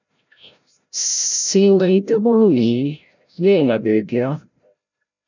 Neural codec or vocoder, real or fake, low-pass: codec, 16 kHz, 0.5 kbps, FreqCodec, larger model; fake; 7.2 kHz